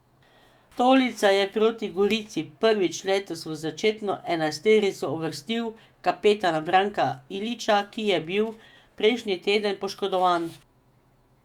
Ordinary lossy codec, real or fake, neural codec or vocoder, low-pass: Opus, 64 kbps; fake; autoencoder, 48 kHz, 128 numbers a frame, DAC-VAE, trained on Japanese speech; 19.8 kHz